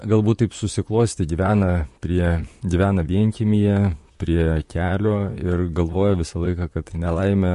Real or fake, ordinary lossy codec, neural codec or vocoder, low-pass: fake; MP3, 48 kbps; vocoder, 44.1 kHz, 128 mel bands, Pupu-Vocoder; 14.4 kHz